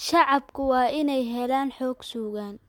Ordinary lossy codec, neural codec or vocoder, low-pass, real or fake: MP3, 96 kbps; none; 19.8 kHz; real